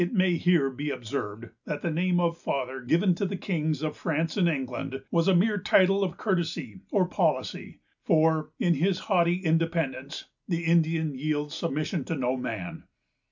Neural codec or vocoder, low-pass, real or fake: none; 7.2 kHz; real